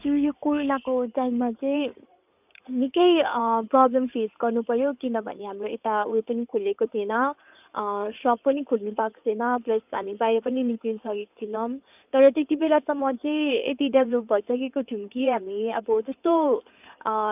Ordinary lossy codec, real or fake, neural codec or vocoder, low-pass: none; fake; codec, 16 kHz in and 24 kHz out, 2.2 kbps, FireRedTTS-2 codec; 3.6 kHz